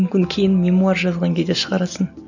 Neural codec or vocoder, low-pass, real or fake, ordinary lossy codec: none; 7.2 kHz; real; none